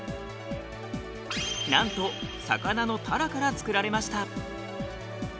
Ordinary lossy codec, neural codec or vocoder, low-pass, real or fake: none; none; none; real